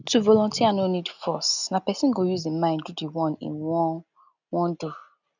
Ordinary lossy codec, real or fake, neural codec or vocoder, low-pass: none; real; none; 7.2 kHz